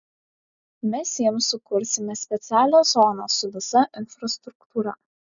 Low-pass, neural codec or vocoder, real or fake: 7.2 kHz; none; real